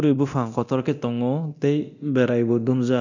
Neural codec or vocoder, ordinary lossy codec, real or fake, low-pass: codec, 24 kHz, 0.9 kbps, DualCodec; none; fake; 7.2 kHz